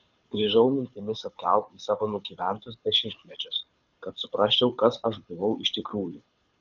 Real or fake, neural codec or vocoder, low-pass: fake; codec, 24 kHz, 6 kbps, HILCodec; 7.2 kHz